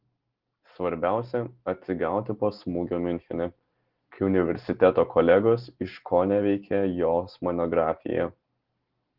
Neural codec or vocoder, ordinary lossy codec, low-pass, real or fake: none; Opus, 16 kbps; 5.4 kHz; real